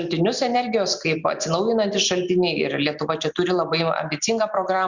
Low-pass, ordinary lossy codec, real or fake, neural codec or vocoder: 7.2 kHz; Opus, 64 kbps; real; none